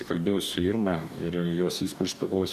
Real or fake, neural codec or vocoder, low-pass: fake; codec, 44.1 kHz, 2.6 kbps, DAC; 14.4 kHz